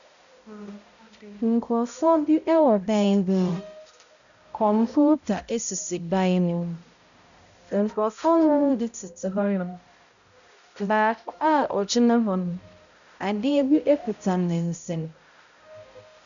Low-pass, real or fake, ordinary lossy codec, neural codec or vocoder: 7.2 kHz; fake; MP3, 96 kbps; codec, 16 kHz, 0.5 kbps, X-Codec, HuBERT features, trained on balanced general audio